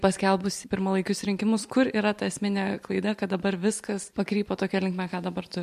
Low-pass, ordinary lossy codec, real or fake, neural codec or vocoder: 14.4 kHz; MP3, 64 kbps; real; none